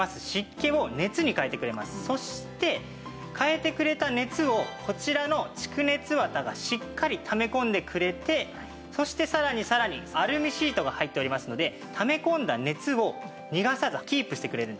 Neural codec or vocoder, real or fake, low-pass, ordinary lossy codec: none; real; none; none